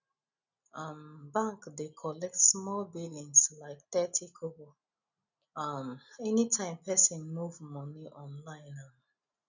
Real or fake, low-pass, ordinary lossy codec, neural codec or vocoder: real; 7.2 kHz; none; none